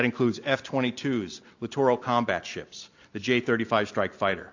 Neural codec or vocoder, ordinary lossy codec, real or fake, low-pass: none; AAC, 48 kbps; real; 7.2 kHz